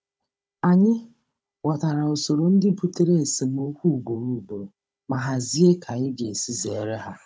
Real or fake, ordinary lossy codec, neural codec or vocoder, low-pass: fake; none; codec, 16 kHz, 16 kbps, FunCodec, trained on Chinese and English, 50 frames a second; none